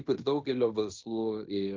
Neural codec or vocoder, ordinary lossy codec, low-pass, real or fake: codec, 16 kHz in and 24 kHz out, 0.9 kbps, LongCat-Audio-Codec, fine tuned four codebook decoder; Opus, 24 kbps; 7.2 kHz; fake